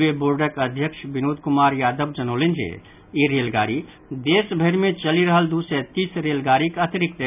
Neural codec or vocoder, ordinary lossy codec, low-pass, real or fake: none; none; 3.6 kHz; real